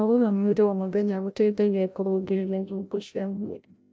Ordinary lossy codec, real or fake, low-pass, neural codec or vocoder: none; fake; none; codec, 16 kHz, 0.5 kbps, FreqCodec, larger model